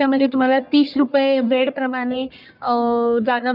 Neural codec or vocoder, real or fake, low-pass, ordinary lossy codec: codec, 44.1 kHz, 1.7 kbps, Pupu-Codec; fake; 5.4 kHz; none